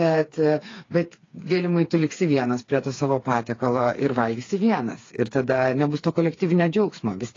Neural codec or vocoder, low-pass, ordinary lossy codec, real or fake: codec, 16 kHz, 4 kbps, FreqCodec, smaller model; 7.2 kHz; AAC, 32 kbps; fake